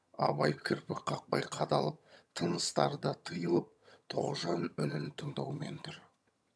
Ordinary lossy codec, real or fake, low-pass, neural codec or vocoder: none; fake; none; vocoder, 22.05 kHz, 80 mel bands, HiFi-GAN